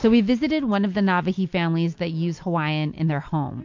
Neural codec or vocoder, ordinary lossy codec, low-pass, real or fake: none; MP3, 48 kbps; 7.2 kHz; real